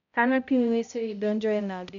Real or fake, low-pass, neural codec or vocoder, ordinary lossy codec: fake; 7.2 kHz; codec, 16 kHz, 0.5 kbps, X-Codec, HuBERT features, trained on balanced general audio; none